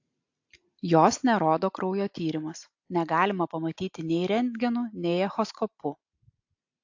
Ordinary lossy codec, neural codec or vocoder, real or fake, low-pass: AAC, 48 kbps; none; real; 7.2 kHz